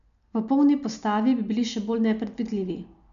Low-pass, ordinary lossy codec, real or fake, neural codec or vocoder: 7.2 kHz; none; real; none